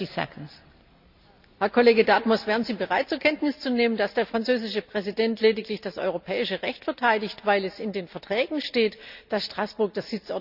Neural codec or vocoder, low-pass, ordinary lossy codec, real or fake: none; 5.4 kHz; none; real